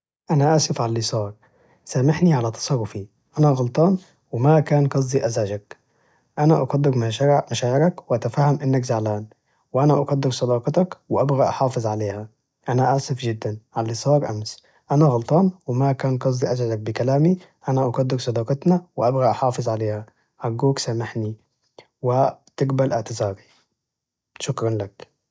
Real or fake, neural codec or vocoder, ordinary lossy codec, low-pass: real; none; none; none